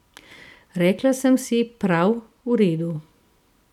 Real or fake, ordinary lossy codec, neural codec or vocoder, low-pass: real; none; none; 19.8 kHz